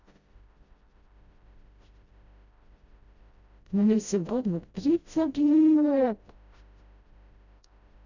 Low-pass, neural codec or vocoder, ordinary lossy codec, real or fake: 7.2 kHz; codec, 16 kHz, 0.5 kbps, FreqCodec, smaller model; none; fake